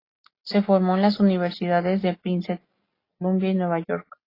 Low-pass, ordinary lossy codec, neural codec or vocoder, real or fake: 5.4 kHz; AAC, 24 kbps; none; real